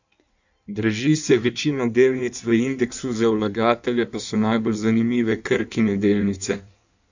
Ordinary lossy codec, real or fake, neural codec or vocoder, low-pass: none; fake; codec, 16 kHz in and 24 kHz out, 1.1 kbps, FireRedTTS-2 codec; 7.2 kHz